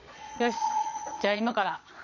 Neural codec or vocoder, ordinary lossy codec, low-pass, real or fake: vocoder, 22.05 kHz, 80 mel bands, Vocos; none; 7.2 kHz; fake